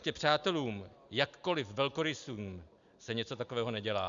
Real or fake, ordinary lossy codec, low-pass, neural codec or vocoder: real; Opus, 64 kbps; 7.2 kHz; none